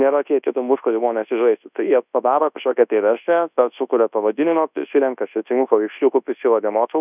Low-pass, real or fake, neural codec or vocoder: 3.6 kHz; fake; codec, 24 kHz, 0.9 kbps, WavTokenizer, large speech release